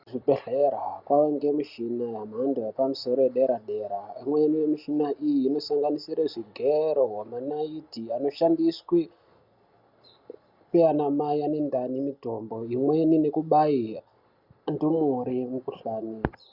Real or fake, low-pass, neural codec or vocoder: real; 5.4 kHz; none